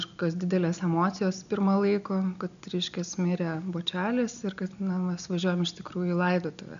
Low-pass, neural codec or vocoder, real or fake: 7.2 kHz; none; real